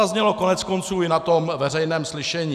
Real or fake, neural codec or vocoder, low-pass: real; none; 14.4 kHz